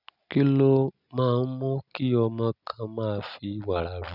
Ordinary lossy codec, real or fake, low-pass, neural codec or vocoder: none; real; 5.4 kHz; none